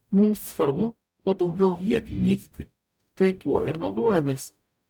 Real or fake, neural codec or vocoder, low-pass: fake; codec, 44.1 kHz, 0.9 kbps, DAC; 19.8 kHz